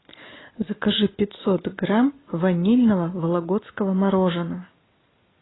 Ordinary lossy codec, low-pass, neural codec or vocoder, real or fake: AAC, 16 kbps; 7.2 kHz; none; real